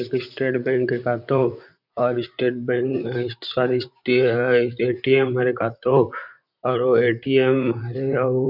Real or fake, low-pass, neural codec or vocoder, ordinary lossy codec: fake; 5.4 kHz; vocoder, 44.1 kHz, 128 mel bands, Pupu-Vocoder; none